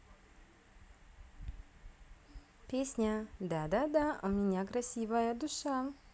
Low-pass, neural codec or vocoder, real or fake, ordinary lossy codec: none; none; real; none